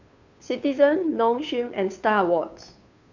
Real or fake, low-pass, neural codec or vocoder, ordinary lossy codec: fake; 7.2 kHz; codec, 16 kHz, 2 kbps, FunCodec, trained on Chinese and English, 25 frames a second; none